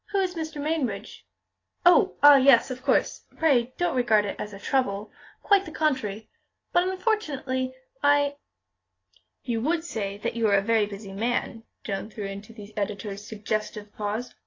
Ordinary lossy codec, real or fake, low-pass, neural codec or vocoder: AAC, 32 kbps; real; 7.2 kHz; none